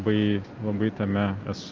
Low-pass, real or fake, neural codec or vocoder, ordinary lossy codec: 7.2 kHz; real; none; Opus, 24 kbps